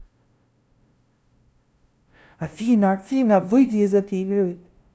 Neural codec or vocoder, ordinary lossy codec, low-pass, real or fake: codec, 16 kHz, 0.5 kbps, FunCodec, trained on LibriTTS, 25 frames a second; none; none; fake